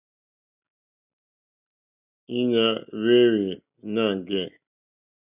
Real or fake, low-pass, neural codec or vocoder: real; 3.6 kHz; none